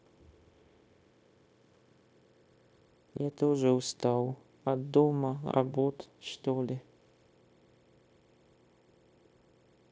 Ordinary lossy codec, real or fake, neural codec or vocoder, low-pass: none; fake; codec, 16 kHz, 0.9 kbps, LongCat-Audio-Codec; none